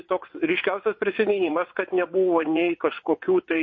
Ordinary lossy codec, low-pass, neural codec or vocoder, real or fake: MP3, 32 kbps; 7.2 kHz; vocoder, 22.05 kHz, 80 mel bands, WaveNeXt; fake